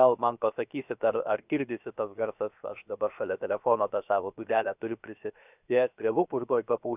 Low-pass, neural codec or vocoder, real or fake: 3.6 kHz; codec, 16 kHz, 0.7 kbps, FocalCodec; fake